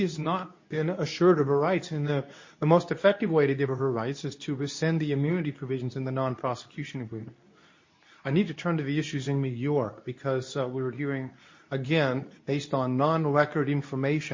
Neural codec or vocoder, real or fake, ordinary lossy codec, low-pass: codec, 24 kHz, 0.9 kbps, WavTokenizer, medium speech release version 2; fake; MP3, 32 kbps; 7.2 kHz